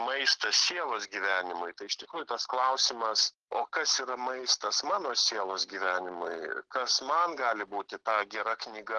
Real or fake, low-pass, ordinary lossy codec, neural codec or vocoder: real; 9.9 kHz; Opus, 16 kbps; none